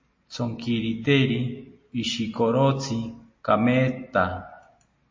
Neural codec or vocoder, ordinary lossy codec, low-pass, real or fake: none; MP3, 32 kbps; 7.2 kHz; real